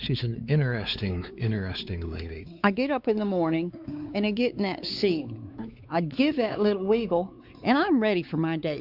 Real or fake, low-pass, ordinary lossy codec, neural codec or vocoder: fake; 5.4 kHz; Opus, 64 kbps; codec, 16 kHz, 4 kbps, X-Codec, WavLM features, trained on Multilingual LibriSpeech